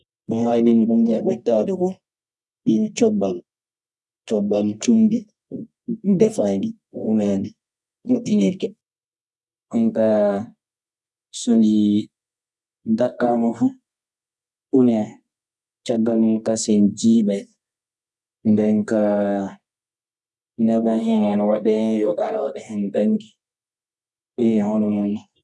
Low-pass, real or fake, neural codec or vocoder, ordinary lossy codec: none; fake; codec, 24 kHz, 0.9 kbps, WavTokenizer, medium music audio release; none